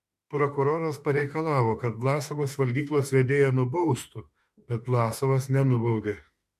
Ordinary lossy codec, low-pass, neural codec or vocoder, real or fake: MP3, 64 kbps; 14.4 kHz; autoencoder, 48 kHz, 32 numbers a frame, DAC-VAE, trained on Japanese speech; fake